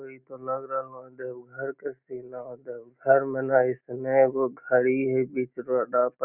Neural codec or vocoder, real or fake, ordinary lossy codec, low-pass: none; real; none; 3.6 kHz